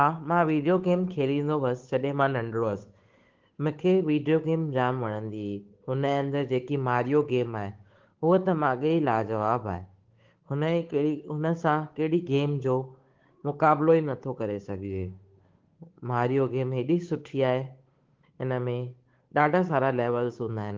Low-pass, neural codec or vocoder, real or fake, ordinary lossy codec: 7.2 kHz; codec, 16 kHz, 8 kbps, FunCodec, trained on Chinese and English, 25 frames a second; fake; Opus, 16 kbps